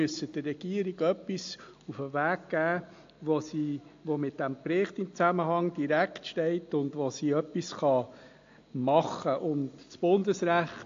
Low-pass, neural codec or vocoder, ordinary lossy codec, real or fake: 7.2 kHz; none; AAC, 48 kbps; real